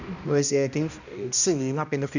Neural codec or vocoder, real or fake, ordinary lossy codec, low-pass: codec, 16 kHz, 1 kbps, X-Codec, HuBERT features, trained on balanced general audio; fake; none; 7.2 kHz